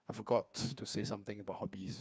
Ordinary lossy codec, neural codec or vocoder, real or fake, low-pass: none; codec, 16 kHz, 2 kbps, FreqCodec, larger model; fake; none